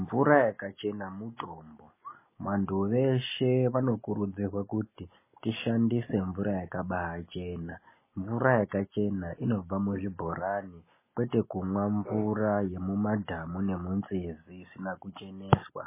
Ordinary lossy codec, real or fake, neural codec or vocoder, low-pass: MP3, 16 kbps; real; none; 3.6 kHz